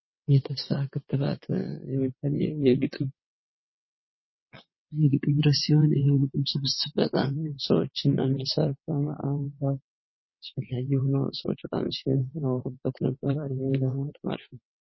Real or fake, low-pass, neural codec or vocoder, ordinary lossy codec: fake; 7.2 kHz; vocoder, 22.05 kHz, 80 mel bands, WaveNeXt; MP3, 24 kbps